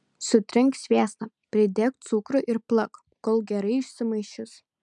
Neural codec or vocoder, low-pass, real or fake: none; 10.8 kHz; real